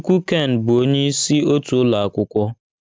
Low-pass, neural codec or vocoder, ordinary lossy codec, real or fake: none; none; none; real